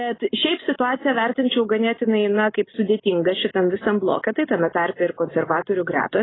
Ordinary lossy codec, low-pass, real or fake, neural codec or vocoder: AAC, 16 kbps; 7.2 kHz; real; none